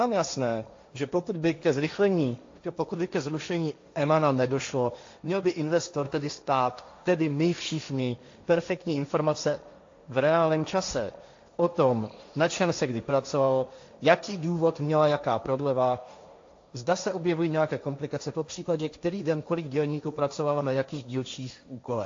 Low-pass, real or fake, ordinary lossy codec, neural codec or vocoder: 7.2 kHz; fake; AAC, 48 kbps; codec, 16 kHz, 1.1 kbps, Voila-Tokenizer